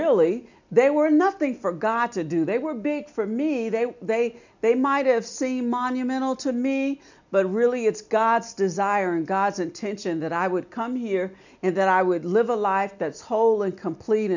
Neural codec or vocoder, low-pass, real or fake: none; 7.2 kHz; real